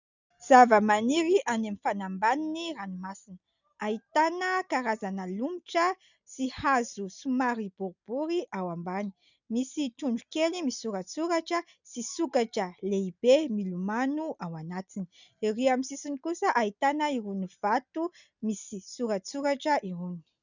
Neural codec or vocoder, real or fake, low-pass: none; real; 7.2 kHz